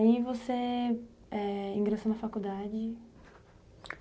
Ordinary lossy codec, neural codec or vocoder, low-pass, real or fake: none; none; none; real